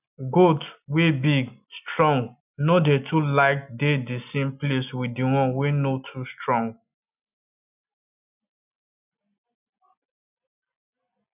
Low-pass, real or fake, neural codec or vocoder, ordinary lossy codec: 3.6 kHz; real; none; none